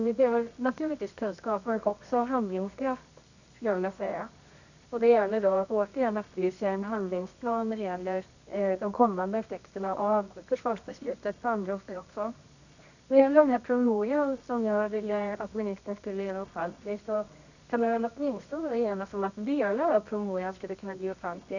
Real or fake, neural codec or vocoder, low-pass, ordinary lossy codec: fake; codec, 24 kHz, 0.9 kbps, WavTokenizer, medium music audio release; 7.2 kHz; none